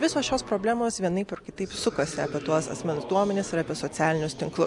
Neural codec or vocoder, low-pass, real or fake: none; 10.8 kHz; real